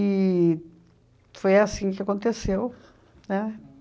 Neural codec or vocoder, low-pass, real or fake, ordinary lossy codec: none; none; real; none